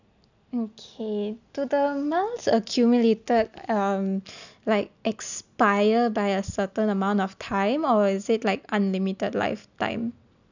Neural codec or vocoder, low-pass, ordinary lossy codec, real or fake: none; 7.2 kHz; none; real